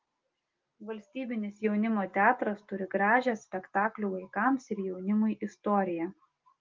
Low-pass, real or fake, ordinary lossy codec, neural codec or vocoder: 7.2 kHz; real; Opus, 32 kbps; none